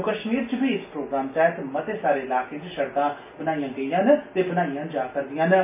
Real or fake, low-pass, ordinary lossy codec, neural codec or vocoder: real; 3.6 kHz; MP3, 24 kbps; none